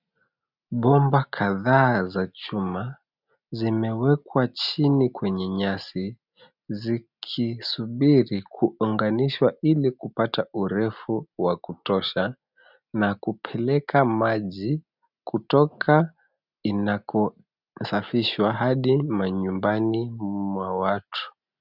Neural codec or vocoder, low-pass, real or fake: none; 5.4 kHz; real